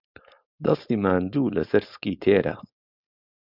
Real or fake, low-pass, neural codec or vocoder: fake; 5.4 kHz; codec, 16 kHz, 4.8 kbps, FACodec